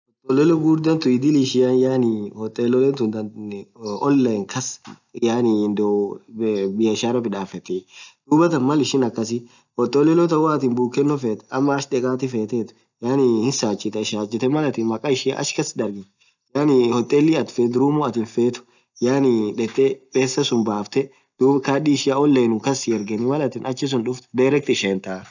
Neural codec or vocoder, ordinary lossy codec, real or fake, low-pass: none; none; real; 7.2 kHz